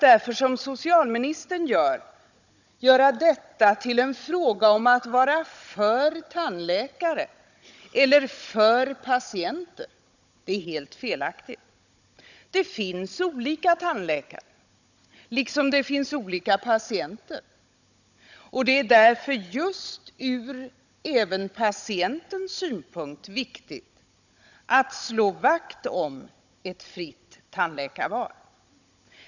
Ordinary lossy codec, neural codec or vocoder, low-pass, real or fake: none; codec, 16 kHz, 16 kbps, FunCodec, trained on Chinese and English, 50 frames a second; 7.2 kHz; fake